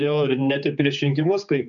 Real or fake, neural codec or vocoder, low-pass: fake; codec, 16 kHz, 4 kbps, X-Codec, HuBERT features, trained on general audio; 7.2 kHz